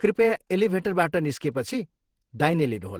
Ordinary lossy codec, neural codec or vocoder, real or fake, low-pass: Opus, 16 kbps; vocoder, 48 kHz, 128 mel bands, Vocos; fake; 19.8 kHz